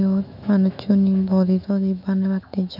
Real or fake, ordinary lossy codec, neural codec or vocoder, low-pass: fake; none; autoencoder, 48 kHz, 128 numbers a frame, DAC-VAE, trained on Japanese speech; 5.4 kHz